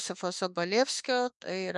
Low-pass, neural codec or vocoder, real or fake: 10.8 kHz; autoencoder, 48 kHz, 32 numbers a frame, DAC-VAE, trained on Japanese speech; fake